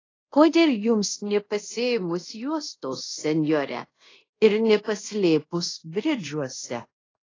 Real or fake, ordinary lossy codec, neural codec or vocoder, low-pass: fake; AAC, 32 kbps; codec, 24 kHz, 0.5 kbps, DualCodec; 7.2 kHz